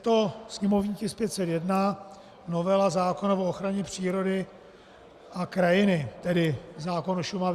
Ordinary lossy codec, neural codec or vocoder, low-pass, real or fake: Opus, 64 kbps; none; 14.4 kHz; real